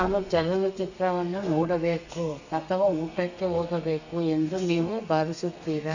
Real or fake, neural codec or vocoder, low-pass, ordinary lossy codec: fake; codec, 32 kHz, 1.9 kbps, SNAC; 7.2 kHz; none